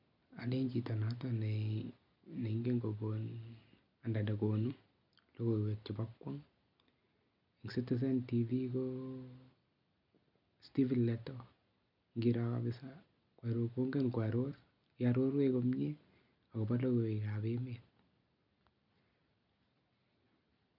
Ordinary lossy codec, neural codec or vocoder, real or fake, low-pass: none; none; real; 5.4 kHz